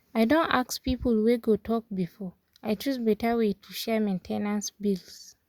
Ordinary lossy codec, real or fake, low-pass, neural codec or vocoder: Opus, 64 kbps; real; 19.8 kHz; none